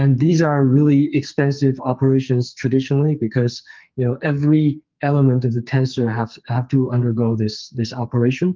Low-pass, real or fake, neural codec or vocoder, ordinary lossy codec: 7.2 kHz; fake; codec, 44.1 kHz, 2.6 kbps, SNAC; Opus, 24 kbps